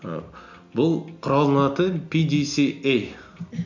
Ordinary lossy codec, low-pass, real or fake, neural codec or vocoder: none; 7.2 kHz; real; none